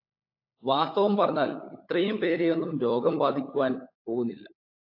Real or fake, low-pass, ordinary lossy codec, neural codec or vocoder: fake; 5.4 kHz; AAC, 32 kbps; codec, 16 kHz, 16 kbps, FunCodec, trained on LibriTTS, 50 frames a second